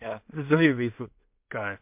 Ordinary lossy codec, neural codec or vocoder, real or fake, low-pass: MP3, 32 kbps; codec, 16 kHz in and 24 kHz out, 0.4 kbps, LongCat-Audio-Codec, two codebook decoder; fake; 3.6 kHz